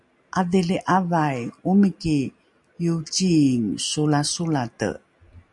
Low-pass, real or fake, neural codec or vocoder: 10.8 kHz; real; none